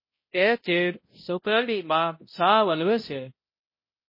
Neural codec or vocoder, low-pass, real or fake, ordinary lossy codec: codec, 16 kHz, 0.5 kbps, X-Codec, HuBERT features, trained on balanced general audio; 5.4 kHz; fake; MP3, 24 kbps